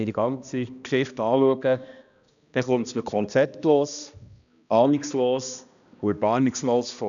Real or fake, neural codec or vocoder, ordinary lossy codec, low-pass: fake; codec, 16 kHz, 1 kbps, X-Codec, HuBERT features, trained on balanced general audio; none; 7.2 kHz